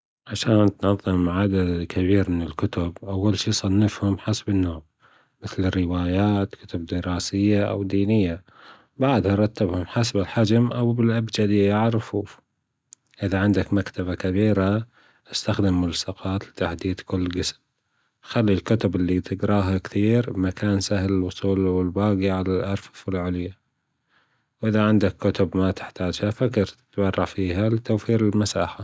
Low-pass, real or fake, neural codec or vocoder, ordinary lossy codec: none; real; none; none